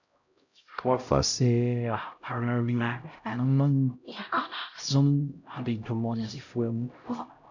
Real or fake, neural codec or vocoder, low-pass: fake; codec, 16 kHz, 0.5 kbps, X-Codec, HuBERT features, trained on LibriSpeech; 7.2 kHz